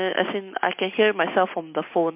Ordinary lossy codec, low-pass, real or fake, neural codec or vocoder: MP3, 32 kbps; 3.6 kHz; real; none